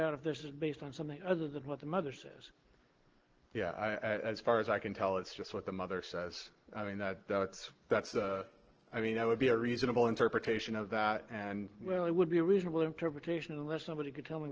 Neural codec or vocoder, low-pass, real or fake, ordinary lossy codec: none; 7.2 kHz; real; Opus, 16 kbps